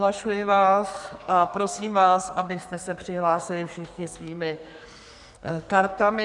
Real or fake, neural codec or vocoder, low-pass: fake; codec, 44.1 kHz, 2.6 kbps, SNAC; 10.8 kHz